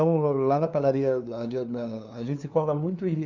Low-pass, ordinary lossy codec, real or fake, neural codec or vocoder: 7.2 kHz; none; fake; codec, 16 kHz, 2 kbps, FunCodec, trained on LibriTTS, 25 frames a second